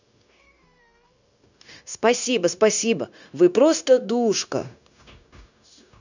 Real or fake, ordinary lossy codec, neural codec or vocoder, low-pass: fake; none; codec, 16 kHz, 0.9 kbps, LongCat-Audio-Codec; 7.2 kHz